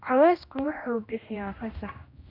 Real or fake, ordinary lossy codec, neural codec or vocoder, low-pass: fake; none; codec, 16 kHz, 1 kbps, X-Codec, HuBERT features, trained on general audio; 5.4 kHz